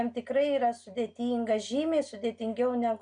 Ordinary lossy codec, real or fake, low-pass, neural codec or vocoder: MP3, 96 kbps; real; 9.9 kHz; none